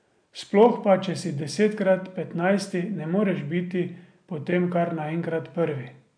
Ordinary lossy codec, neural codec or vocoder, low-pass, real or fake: MP3, 64 kbps; none; 9.9 kHz; real